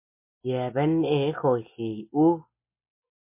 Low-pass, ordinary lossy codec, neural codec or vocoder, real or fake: 3.6 kHz; MP3, 24 kbps; none; real